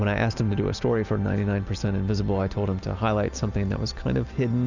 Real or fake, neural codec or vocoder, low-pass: real; none; 7.2 kHz